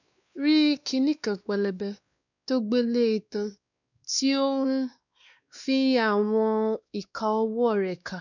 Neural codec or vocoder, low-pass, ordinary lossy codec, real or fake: codec, 16 kHz, 2 kbps, X-Codec, WavLM features, trained on Multilingual LibriSpeech; 7.2 kHz; none; fake